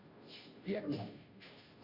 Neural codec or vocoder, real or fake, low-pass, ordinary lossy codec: codec, 16 kHz, 0.5 kbps, FunCodec, trained on Chinese and English, 25 frames a second; fake; 5.4 kHz; Opus, 64 kbps